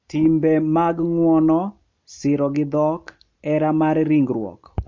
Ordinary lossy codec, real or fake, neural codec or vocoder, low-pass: MP3, 48 kbps; real; none; 7.2 kHz